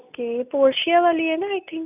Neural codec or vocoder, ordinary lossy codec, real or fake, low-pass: none; none; real; 3.6 kHz